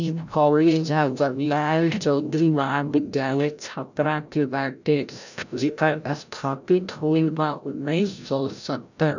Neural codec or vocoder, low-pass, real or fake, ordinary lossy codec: codec, 16 kHz, 0.5 kbps, FreqCodec, larger model; 7.2 kHz; fake; none